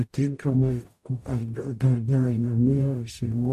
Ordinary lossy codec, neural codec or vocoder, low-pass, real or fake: AAC, 64 kbps; codec, 44.1 kHz, 0.9 kbps, DAC; 14.4 kHz; fake